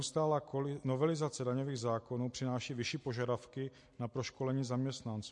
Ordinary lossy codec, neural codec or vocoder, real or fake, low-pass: MP3, 48 kbps; none; real; 10.8 kHz